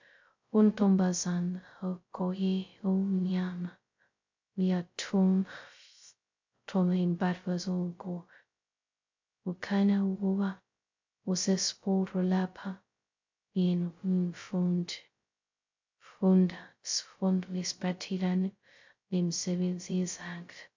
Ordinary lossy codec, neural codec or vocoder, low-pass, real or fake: MP3, 64 kbps; codec, 16 kHz, 0.2 kbps, FocalCodec; 7.2 kHz; fake